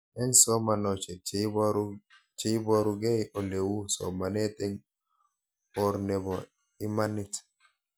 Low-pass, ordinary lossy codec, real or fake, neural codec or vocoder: none; none; real; none